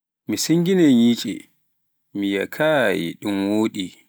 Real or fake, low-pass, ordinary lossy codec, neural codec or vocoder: real; none; none; none